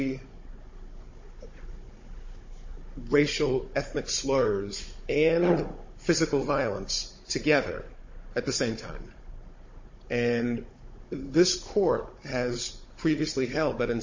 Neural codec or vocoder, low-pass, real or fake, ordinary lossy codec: codec, 16 kHz, 16 kbps, FunCodec, trained on Chinese and English, 50 frames a second; 7.2 kHz; fake; MP3, 32 kbps